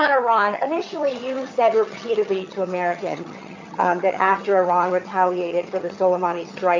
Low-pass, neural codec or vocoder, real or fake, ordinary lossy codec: 7.2 kHz; vocoder, 22.05 kHz, 80 mel bands, HiFi-GAN; fake; AAC, 48 kbps